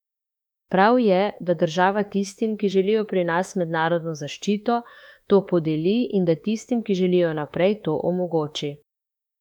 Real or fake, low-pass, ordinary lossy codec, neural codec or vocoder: fake; 19.8 kHz; none; autoencoder, 48 kHz, 32 numbers a frame, DAC-VAE, trained on Japanese speech